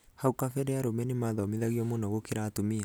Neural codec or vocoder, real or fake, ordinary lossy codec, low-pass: none; real; none; none